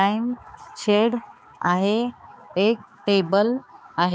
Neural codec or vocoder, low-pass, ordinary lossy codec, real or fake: codec, 16 kHz, 4 kbps, X-Codec, HuBERT features, trained on balanced general audio; none; none; fake